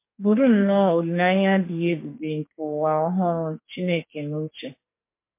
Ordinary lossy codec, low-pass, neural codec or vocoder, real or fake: MP3, 24 kbps; 3.6 kHz; codec, 44.1 kHz, 2.6 kbps, SNAC; fake